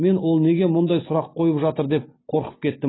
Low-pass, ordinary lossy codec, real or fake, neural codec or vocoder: 7.2 kHz; AAC, 16 kbps; real; none